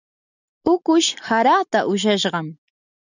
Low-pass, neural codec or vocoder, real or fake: 7.2 kHz; none; real